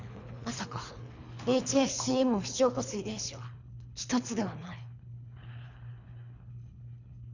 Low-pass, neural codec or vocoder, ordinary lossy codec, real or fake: 7.2 kHz; codec, 24 kHz, 3 kbps, HILCodec; none; fake